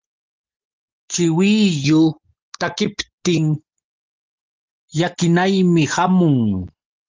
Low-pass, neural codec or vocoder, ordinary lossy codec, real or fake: 7.2 kHz; none; Opus, 32 kbps; real